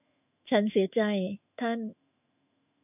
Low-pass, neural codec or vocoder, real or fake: 3.6 kHz; autoencoder, 48 kHz, 128 numbers a frame, DAC-VAE, trained on Japanese speech; fake